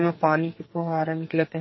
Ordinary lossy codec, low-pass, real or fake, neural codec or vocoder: MP3, 24 kbps; 7.2 kHz; fake; codec, 44.1 kHz, 2.6 kbps, SNAC